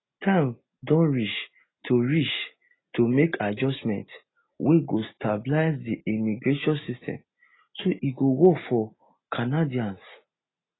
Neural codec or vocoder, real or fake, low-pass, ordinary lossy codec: none; real; 7.2 kHz; AAC, 16 kbps